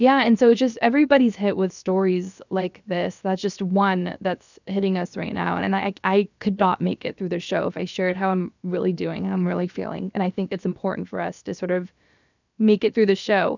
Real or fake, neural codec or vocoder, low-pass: fake; codec, 16 kHz, about 1 kbps, DyCAST, with the encoder's durations; 7.2 kHz